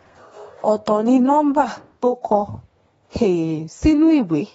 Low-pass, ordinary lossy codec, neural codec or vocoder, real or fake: 14.4 kHz; AAC, 24 kbps; codec, 32 kHz, 1.9 kbps, SNAC; fake